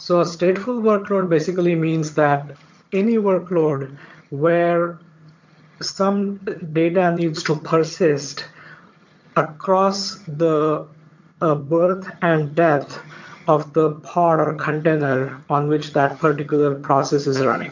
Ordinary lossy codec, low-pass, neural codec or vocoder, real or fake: MP3, 48 kbps; 7.2 kHz; vocoder, 22.05 kHz, 80 mel bands, HiFi-GAN; fake